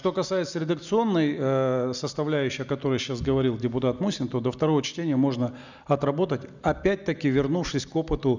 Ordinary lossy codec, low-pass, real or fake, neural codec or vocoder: none; 7.2 kHz; real; none